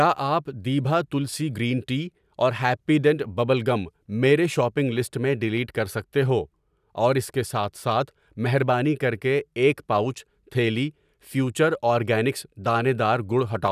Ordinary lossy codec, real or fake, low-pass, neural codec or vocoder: none; real; 14.4 kHz; none